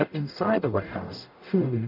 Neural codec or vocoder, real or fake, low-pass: codec, 44.1 kHz, 0.9 kbps, DAC; fake; 5.4 kHz